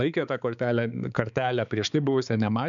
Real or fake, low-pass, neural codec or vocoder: fake; 7.2 kHz; codec, 16 kHz, 4 kbps, X-Codec, HuBERT features, trained on balanced general audio